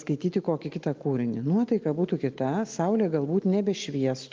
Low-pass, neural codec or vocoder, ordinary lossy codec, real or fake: 7.2 kHz; none; Opus, 24 kbps; real